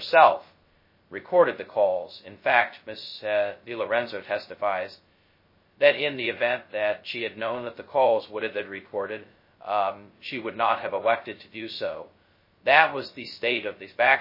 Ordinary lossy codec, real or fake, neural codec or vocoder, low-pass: MP3, 24 kbps; fake; codec, 16 kHz, 0.2 kbps, FocalCodec; 5.4 kHz